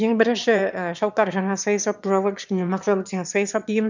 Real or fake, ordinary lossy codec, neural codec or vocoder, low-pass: fake; none; autoencoder, 22.05 kHz, a latent of 192 numbers a frame, VITS, trained on one speaker; 7.2 kHz